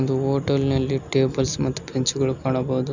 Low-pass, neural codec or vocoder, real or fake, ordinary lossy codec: 7.2 kHz; none; real; none